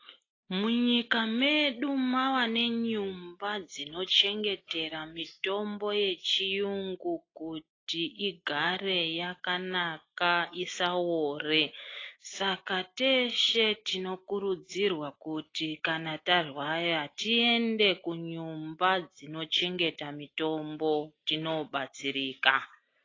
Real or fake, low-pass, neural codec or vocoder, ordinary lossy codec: real; 7.2 kHz; none; AAC, 32 kbps